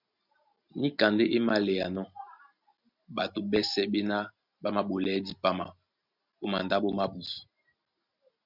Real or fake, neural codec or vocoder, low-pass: real; none; 5.4 kHz